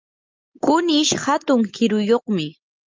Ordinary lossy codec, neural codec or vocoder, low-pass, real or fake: Opus, 24 kbps; none; 7.2 kHz; real